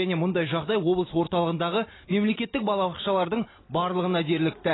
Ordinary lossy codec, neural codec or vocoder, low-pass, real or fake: AAC, 16 kbps; none; 7.2 kHz; real